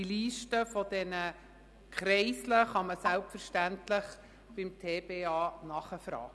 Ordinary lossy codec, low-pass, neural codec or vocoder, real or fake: none; none; none; real